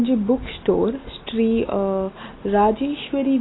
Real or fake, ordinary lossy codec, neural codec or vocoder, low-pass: real; AAC, 16 kbps; none; 7.2 kHz